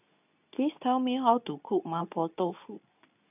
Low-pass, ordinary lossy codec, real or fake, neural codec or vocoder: 3.6 kHz; none; fake; codec, 24 kHz, 0.9 kbps, WavTokenizer, medium speech release version 2